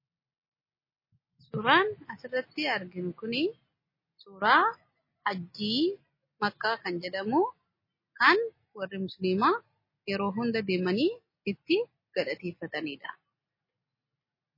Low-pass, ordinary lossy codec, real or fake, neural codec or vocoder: 5.4 kHz; MP3, 24 kbps; real; none